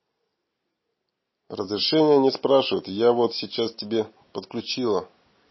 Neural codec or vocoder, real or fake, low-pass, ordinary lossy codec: none; real; 7.2 kHz; MP3, 24 kbps